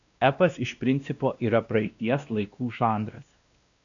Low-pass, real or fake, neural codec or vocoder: 7.2 kHz; fake; codec, 16 kHz, 1 kbps, X-Codec, WavLM features, trained on Multilingual LibriSpeech